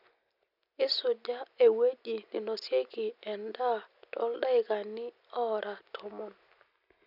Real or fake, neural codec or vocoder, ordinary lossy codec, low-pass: real; none; none; 5.4 kHz